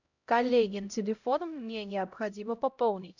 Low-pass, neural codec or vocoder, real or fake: 7.2 kHz; codec, 16 kHz, 0.5 kbps, X-Codec, HuBERT features, trained on LibriSpeech; fake